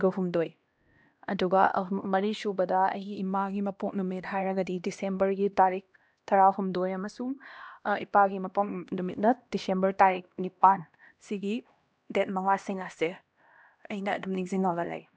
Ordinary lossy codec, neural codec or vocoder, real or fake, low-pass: none; codec, 16 kHz, 1 kbps, X-Codec, HuBERT features, trained on LibriSpeech; fake; none